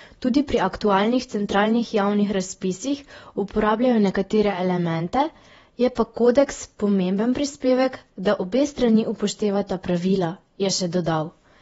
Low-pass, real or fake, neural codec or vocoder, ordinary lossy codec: 19.8 kHz; fake; vocoder, 48 kHz, 128 mel bands, Vocos; AAC, 24 kbps